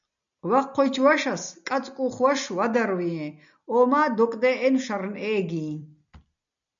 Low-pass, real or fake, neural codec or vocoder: 7.2 kHz; real; none